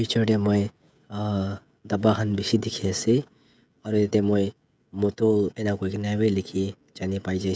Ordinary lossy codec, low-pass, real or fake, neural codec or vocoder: none; none; fake; codec, 16 kHz, 16 kbps, FreqCodec, smaller model